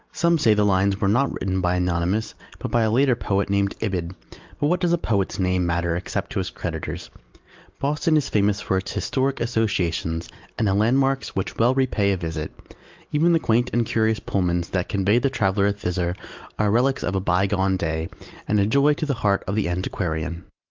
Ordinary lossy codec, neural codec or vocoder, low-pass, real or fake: Opus, 32 kbps; none; 7.2 kHz; real